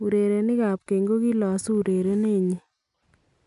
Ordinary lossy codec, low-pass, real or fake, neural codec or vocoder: none; 10.8 kHz; real; none